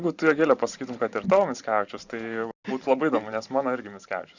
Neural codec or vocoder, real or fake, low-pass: vocoder, 44.1 kHz, 128 mel bands every 512 samples, BigVGAN v2; fake; 7.2 kHz